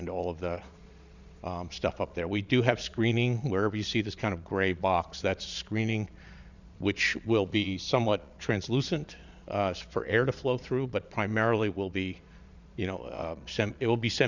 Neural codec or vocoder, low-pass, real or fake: vocoder, 22.05 kHz, 80 mel bands, Vocos; 7.2 kHz; fake